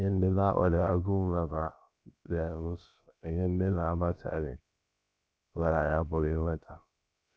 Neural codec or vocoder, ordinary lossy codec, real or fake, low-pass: codec, 16 kHz, about 1 kbps, DyCAST, with the encoder's durations; none; fake; none